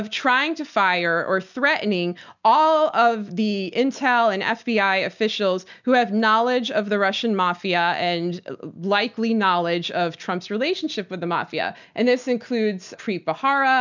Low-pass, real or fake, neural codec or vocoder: 7.2 kHz; real; none